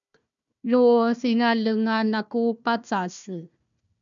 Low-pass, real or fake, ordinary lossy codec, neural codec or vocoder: 7.2 kHz; fake; MP3, 96 kbps; codec, 16 kHz, 1 kbps, FunCodec, trained on Chinese and English, 50 frames a second